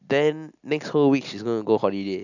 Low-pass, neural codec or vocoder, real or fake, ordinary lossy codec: 7.2 kHz; none; real; none